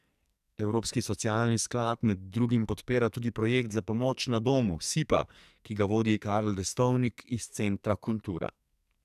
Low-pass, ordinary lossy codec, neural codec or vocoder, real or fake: 14.4 kHz; none; codec, 44.1 kHz, 2.6 kbps, SNAC; fake